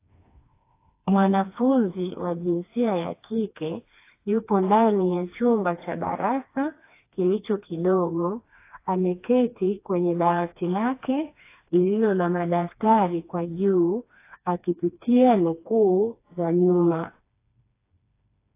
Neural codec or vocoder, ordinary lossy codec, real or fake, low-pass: codec, 16 kHz, 2 kbps, FreqCodec, smaller model; AAC, 24 kbps; fake; 3.6 kHz